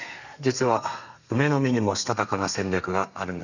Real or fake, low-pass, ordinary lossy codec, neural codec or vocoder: fake; 7.2 kHz; none; codec, 32 kHz, 1.9 kbps, SNAC